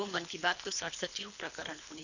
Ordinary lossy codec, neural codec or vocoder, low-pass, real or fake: none; codec, 24 kHz, 3 kbps, HILCodec; 7.2 kHz; fake